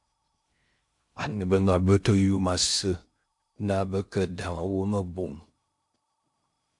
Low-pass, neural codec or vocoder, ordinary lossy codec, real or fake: 10.8 kHz; codec, 16 kHz in and 24 kHz out, 0.6 kbps, FocalCodec, streaming, 2048 codes; MP3, 64 kbps; fake